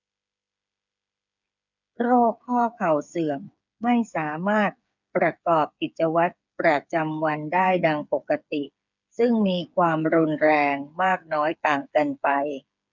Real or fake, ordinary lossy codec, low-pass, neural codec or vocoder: fake; none; 7.2 kHz; codec, 16 kHz, 8 kbps, FreqCodec, smaller model